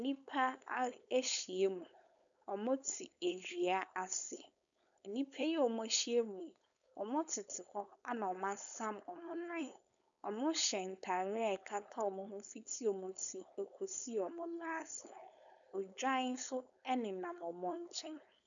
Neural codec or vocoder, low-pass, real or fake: codec, 16 kHz, 4.8 kbps, FACodec; 7.2 kHz; fake